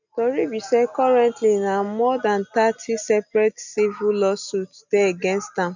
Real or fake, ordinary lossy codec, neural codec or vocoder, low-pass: real; none; none; 7.2 kHz